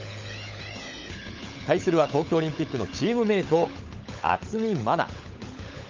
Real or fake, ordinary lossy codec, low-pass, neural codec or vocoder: fake; Opus, 32 kbps; 7.2 kHz; codec, 16 kHz, 16 kbps, FunCodec, trained on LibriTTS, 50 frames a second